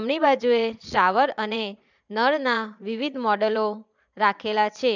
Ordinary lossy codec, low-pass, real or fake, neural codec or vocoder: none; 7.2 kHz; fake; vocoder, 22.05 kHz, 80 mel bands, Vocos